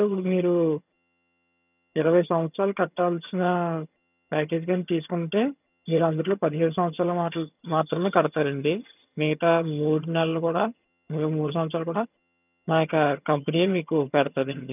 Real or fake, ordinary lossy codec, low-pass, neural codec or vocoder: fake; none; 3.6 kHz; vocoder, 22.05 kHz, 80 mel bands, HiFi-GAN